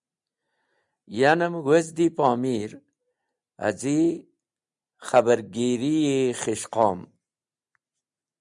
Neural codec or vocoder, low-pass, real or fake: none; 10.8 kHz; real